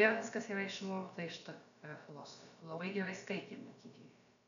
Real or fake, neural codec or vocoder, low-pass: fake; codec, 16 kHz, about 1 kbps, DyCAST, with the encoder's durations; 7.2 kHz